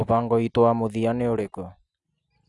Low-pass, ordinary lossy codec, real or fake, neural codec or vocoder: 10.8 kHz; none; real; none